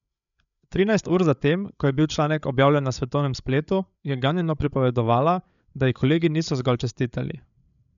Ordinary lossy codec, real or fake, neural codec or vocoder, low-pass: none; fake; codec, 16 kHz, 8 kbps, FreqCodec, larger model; 7.2 kHz